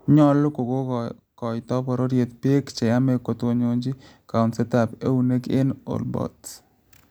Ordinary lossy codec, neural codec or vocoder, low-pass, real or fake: none; none; none; real